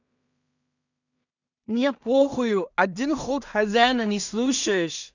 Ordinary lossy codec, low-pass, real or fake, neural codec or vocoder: none; 7.2 kHz; fake; codec, 16 kHz in and 24 kHz out, 0.4 kbps, LongCat-Audio-Codec, two codebook decoder